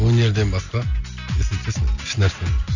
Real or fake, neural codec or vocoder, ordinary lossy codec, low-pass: real; none; none; 7.2 kHz